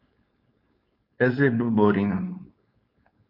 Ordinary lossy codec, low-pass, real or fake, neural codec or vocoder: MP3, 32 kbps; 5.4 kHz; fake; codec, 16 kHz, 4.8 kbps, FACodec